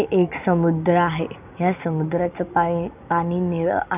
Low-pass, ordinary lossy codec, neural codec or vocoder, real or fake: 3.6 kHz; none; none; real